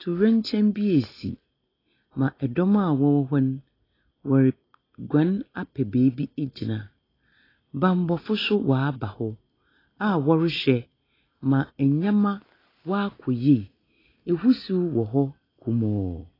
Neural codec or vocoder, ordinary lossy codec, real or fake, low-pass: none; AAC, 24 kbps; real; 5.4 kHz